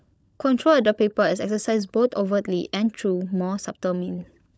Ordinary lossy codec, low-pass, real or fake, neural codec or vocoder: none; none; fake; codec, 16 kHz, 16 kbps, FunCodec, trained on LibriTTS, 50 frames a second